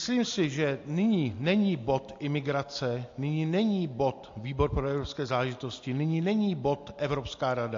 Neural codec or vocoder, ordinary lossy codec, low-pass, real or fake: none; AAC, 48 kbps; 7.2 kHz; real